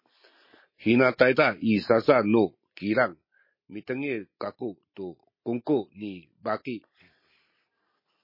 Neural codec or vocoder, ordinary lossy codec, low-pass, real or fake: none; MP3, 24 kbps; 5.4 kHz; real